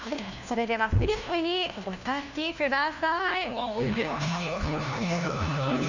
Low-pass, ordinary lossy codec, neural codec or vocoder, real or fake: 7.2 kHz; none; codec, 16 kHz, 1 kbps, FunCodec, trained on LibriTTS, 50 frames a second; fake